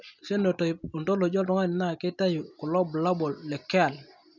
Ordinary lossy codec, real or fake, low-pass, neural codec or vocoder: none; real; 7.2 kHz; none